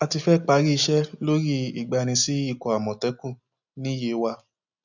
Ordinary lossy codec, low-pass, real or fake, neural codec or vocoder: none; 7.2 kHz; real; none